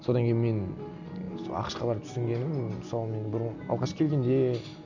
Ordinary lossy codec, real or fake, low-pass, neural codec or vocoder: none; real; 7.2 kHz; none